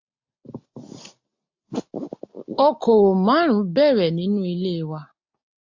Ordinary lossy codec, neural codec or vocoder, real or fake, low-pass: AAC, 48 kbps; none; real; 7.2 kHz